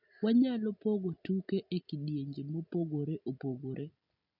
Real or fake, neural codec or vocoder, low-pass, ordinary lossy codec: real; none; 5.4 kHz; none